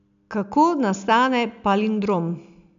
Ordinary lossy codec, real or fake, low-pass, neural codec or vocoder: none; real; 7.2 kHz; none